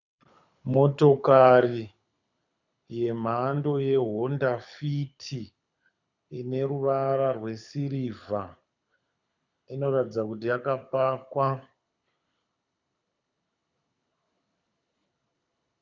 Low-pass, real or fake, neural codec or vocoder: 7.2 kHz; fake; codec, 24 kHz, 6 kbps, HILCodec